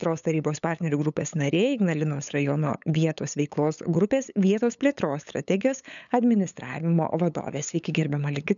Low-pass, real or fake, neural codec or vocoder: 7.2 kHz; fake; codec, 16 kHz, 8 kbps, FunCodec, trained on LibriTTS, 25 frames a second